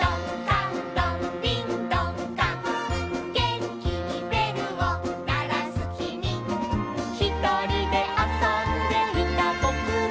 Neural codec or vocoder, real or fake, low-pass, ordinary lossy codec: none; real; none; none